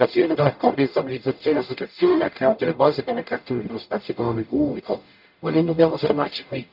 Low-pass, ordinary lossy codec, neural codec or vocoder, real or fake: 5.4 kHz; none; codec, 44.1 kHz, 0.9 kbps, DAC; fake